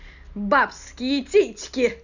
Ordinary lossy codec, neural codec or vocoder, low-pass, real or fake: none; none; 7.2 kHz; real